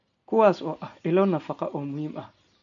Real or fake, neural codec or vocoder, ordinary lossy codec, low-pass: real; none; none; 7.2 kHz